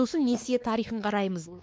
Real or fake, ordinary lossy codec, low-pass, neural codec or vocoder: fake; none; none; codec, 16 kHz, 2 kbps, X-Codec, WavLM features, trained on Multilingual LibriSpeech